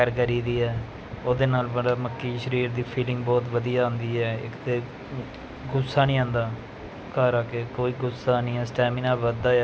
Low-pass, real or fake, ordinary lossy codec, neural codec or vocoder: none; real; none; none